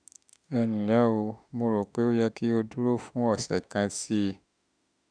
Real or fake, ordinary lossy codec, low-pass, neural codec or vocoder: fake; none; 9.9 kHz; autoencoder, 48 kHz, 32 numbers a frame, DAC-VAE, trained on Japanese speech